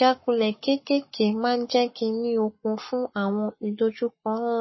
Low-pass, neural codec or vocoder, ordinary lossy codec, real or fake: 7.2 kHz; codec, 44.1 kHz, 7.8 kbps, Pupu-Codec; MP3, 24 kbps; fake